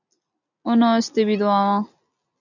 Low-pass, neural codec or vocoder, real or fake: 7.2 kHz; none; real